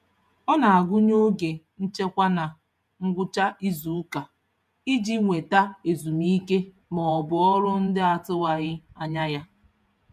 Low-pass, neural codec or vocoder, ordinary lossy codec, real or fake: 14.4 kHz; vocoder, 48 kHz, 128 mel bands, Vocos; MP3, 96 kbps; fake